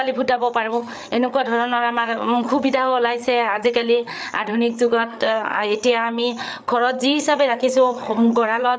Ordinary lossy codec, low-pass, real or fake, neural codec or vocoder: none; none; fake; codec, 16 kHz, 8 kbps, FreqCodec, larger model